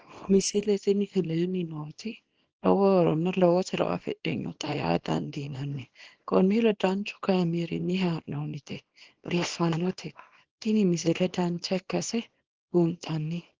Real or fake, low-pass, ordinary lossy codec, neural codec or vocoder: fake; 7.2 kHz; Opus, 16 kbps; codec, 24 kHz, 0.9 kbps, WavTokenizer, small release